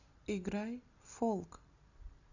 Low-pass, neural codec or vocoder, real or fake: 7.2 kHz; none; real